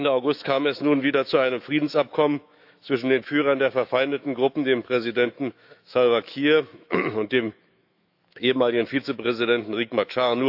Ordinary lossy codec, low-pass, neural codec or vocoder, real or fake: AAC, 48 kbps; 5.4 kHz; autoencoder, 48 kHz, 128 numbers a frame, DAC-VAE, trained on Japanese speech; fake